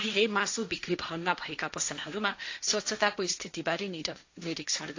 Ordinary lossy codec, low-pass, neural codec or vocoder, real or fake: AAC, 48 kbps; 7.2 kHz; codec, 16 kHz, 1.1 kbps, Voila-Tokenizer; fake